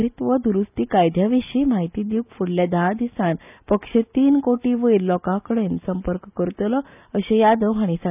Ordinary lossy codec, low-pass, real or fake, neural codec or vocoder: none; 3.6 kHz; real; none